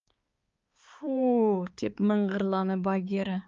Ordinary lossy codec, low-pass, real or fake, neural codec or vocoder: Opus, 32 kbps; 7.2 kHz; fake; codec, 16 kHz, 4 kbps, X-Codec, HuBERT features, trained on balanced general audio